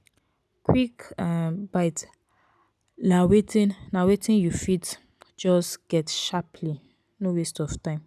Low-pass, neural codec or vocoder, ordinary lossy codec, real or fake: none; none; none; real